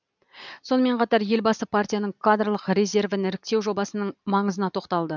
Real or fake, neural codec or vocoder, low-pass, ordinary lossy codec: real; none; 7.2 kHz; none